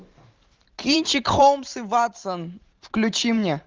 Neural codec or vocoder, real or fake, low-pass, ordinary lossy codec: vocoder, 44.1 kHz, 128 mel bands every 512 samples, BigVGAN v2; fake; 7.2 kHz; Opus, 32 kbps